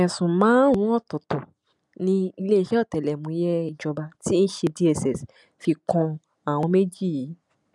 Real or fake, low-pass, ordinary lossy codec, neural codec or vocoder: real; none; none; none